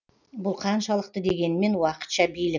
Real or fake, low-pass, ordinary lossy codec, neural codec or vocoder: real; 7.2 kHz; none; none